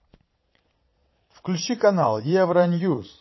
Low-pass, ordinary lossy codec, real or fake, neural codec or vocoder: 7.2 kHz; MP3, 24 kbps; fake; vocoder, 22.05 kHz, 80 mel bands, Vocos